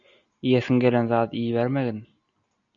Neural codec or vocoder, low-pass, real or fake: none; 7.2 kHz; real